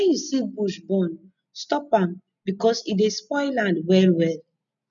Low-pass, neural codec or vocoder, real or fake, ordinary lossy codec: 7.2 kHz; none; real; none